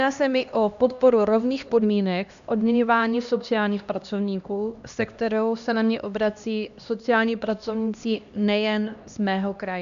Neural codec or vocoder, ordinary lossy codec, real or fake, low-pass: codec, 16 kHz, 1 kbps, X-Codec, HuBERT features, trained on LibriSpeech; MP3, 96 kbps; fake; 7.2 kHz